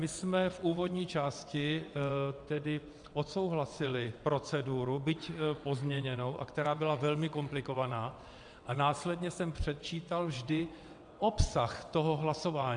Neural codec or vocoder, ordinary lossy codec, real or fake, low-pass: vocoder, 22.05 kHz, 80 mel bands, WaveNeXt; MP3, 96 kbps; fake; 9.9 kHz